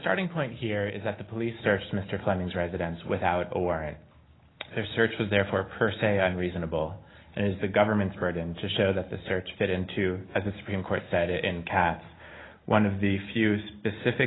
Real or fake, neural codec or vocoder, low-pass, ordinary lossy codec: real; none; 7.2 kHz; AAC, 16 kbps